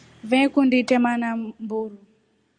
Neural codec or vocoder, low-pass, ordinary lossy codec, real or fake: none; 9.9 kHz; Opus, 64 kbps; real